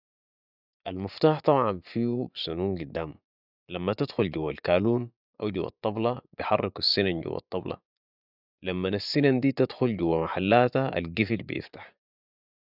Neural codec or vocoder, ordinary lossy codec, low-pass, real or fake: vocoder, 24 kHz, 100 mel bands, Vocos; none; 5.4 kHz; fake